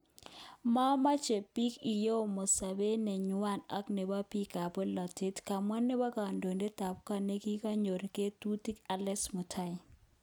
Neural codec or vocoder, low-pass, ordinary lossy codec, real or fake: none; none; none; real